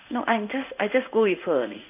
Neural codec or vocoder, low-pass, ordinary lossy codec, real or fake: codec, 16 kHz in and 24 kHz out, 1 kbps, XY-Tokenizer; 3.6 kHz; none; fake